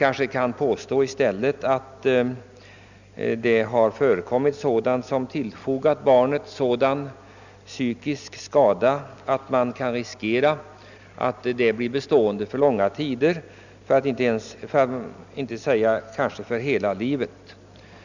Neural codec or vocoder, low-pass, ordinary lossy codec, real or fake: none; 7.2 kHz; none; real